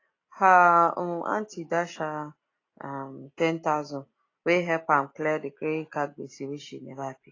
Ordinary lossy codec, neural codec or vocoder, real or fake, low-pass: AAC, 32 kbps; none; real; 7.2 kHz